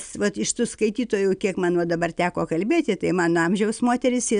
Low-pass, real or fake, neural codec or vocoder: 9.9 kHz; real; none